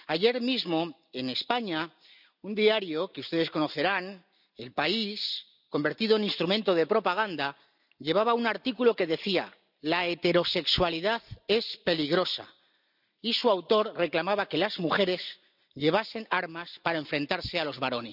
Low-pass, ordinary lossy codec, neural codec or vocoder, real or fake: 5.4 kHz; none; none; real